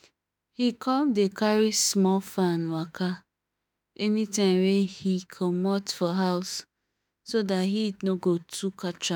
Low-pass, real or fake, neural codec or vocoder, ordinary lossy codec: none; fake; autoencoder, 48 kHz, 32 numbers a frame, DAC-VAE, trained on Japanese speech; none